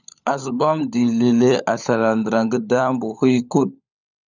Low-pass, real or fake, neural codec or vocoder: 7.2 kHz; fake; codec, 16 kHz, 16 kbps, FunCodec, trained on LibriTTS, 50 frames a second